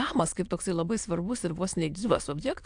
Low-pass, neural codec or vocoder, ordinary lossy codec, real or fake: 9.9 kHz; autoencoder, 22.05 kHz, a latent of 192 numbers a frame, VITS, trained on many speakers; AAC, 64 kbps; fake